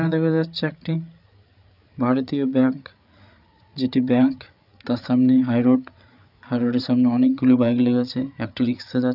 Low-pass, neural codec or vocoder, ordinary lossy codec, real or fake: 5.4 kHz; codec, 16 kHz, 8 kbps, FreqCodec, larger model; none; fake